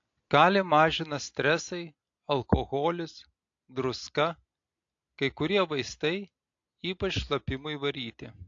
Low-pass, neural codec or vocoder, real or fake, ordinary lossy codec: 7.2 kHz; none; real; AAC, 48 kbps